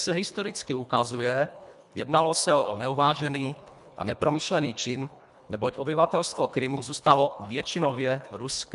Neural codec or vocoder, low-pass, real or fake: codec, 24 kHz, 1.5 kbps, HILCodec; 10.8 kHz; fake